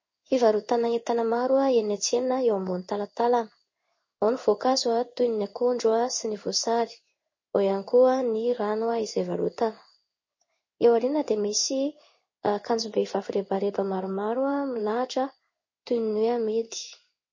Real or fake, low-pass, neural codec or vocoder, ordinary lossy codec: fake; 7.2 kHz; codec, 16 kHz in and 24 kHz out, 1 kbps, XY-Tokenizer; MP3, 32 kbps